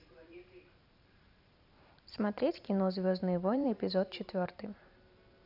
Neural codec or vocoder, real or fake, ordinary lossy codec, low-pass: vocoder, 44.1 kHz, 128 mel bands every 512 samples, BigVGAN v2; fake; none; 5.4 kHz